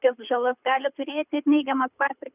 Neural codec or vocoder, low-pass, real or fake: codec, 24 kHz, 6 kbps, HILCodec; 3.6 kHz; fake